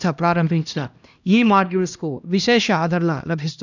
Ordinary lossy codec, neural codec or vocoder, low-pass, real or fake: none; codec, 16 kHz, 1 kbps, X-Codec, HuBERT features, trained on LibriSpeech; 7.2 kHz; fake